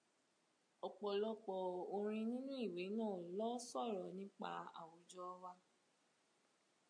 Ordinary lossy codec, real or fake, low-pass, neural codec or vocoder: MP3, 48 kbps; real; 9.9 kHz; none